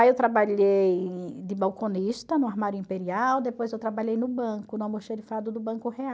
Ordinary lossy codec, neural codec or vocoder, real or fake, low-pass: none; none; real; none